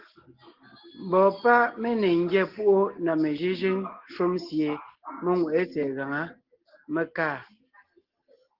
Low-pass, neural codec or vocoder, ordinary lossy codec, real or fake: 5.4 kHz; none; Opus, 16 kbps; real